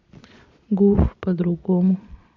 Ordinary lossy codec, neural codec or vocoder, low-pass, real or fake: AAC, 48 kbps; vocoder, 22.05 kHz, 80 mel bands, Vocos; 7.2 kHz; fake